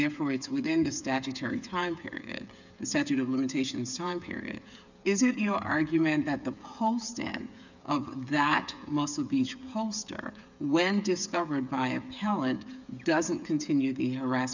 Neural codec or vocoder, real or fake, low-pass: codec, 16 kHz, 8 kbps, FreqCodec, smaller model; fake; 7.2 kHz